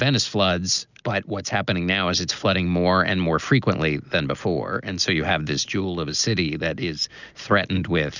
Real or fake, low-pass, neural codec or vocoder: real; 7.2 kHz; none